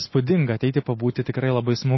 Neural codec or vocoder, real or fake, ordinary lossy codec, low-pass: none; real; MP3, 24 kbps; 7.2 kHz